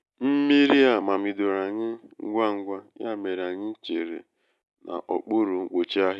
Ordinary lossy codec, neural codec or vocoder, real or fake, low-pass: none; none; real; none